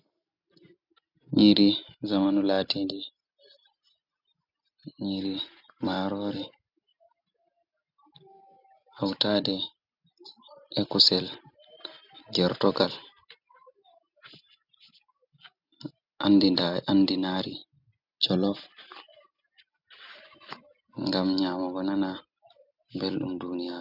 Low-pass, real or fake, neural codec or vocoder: 5.4 kHz; real; none